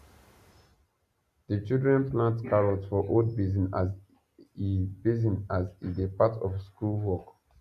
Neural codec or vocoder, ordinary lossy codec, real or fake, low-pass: none; none; real; 14.4 kHz